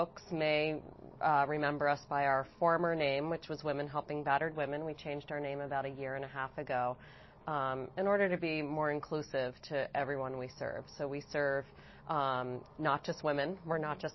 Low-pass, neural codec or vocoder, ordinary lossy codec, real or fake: 7.2 kHz; none; MP3, 24 kbps; real